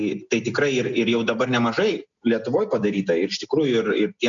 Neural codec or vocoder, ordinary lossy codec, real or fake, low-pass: none; MP3, 96 kbps; real; 7.2 kHz